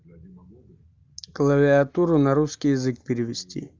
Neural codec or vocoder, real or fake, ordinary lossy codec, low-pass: none; real; Opus, 32 kbps; 7.2 kHz